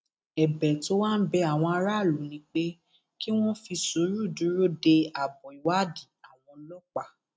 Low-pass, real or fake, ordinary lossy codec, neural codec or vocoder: none; real; none; none